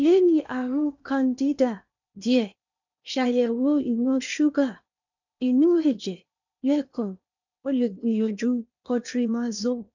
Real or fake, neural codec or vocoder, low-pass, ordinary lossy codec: fake; codec, 16 kHz in and 24 kHz out, 0.8 kbps, FocalCodec, streaming, 65536 codes; 7.2 kHz; none